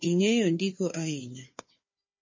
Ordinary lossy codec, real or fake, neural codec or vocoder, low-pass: MP3, 32 kbps; fake; codec, 16 kHz, 4 kbps, FunCodec, trained on Chinese and English, 50 frames a second; 7.2 kHz